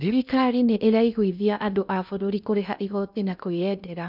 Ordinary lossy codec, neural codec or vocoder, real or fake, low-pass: none; codec, 16 kHz in and 24 kHz out, 0.6 kbps, FocalCodec, streaming, 2048 codes; fake; 5.4 kHz